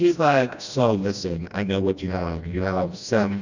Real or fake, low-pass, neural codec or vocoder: fake; 7.2 kHz; codec, 16 kHz, 1 kbps, FreqCodec, smaller model